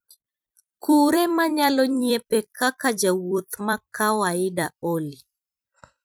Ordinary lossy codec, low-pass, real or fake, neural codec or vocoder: none; none; fake; vocoder, 44.1 kHz, 128 mel bands every 256 samples, BigVGAN v2